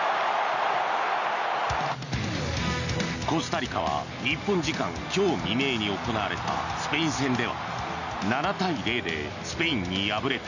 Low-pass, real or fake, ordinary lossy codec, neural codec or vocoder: 7.2 kHz; real; none; none